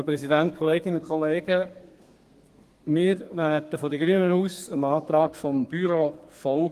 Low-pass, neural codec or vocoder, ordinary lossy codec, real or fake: 14.4 kHz; codec, 32 kHz, 1.9 kbps, SNAC; Opus, 24 kbps; fake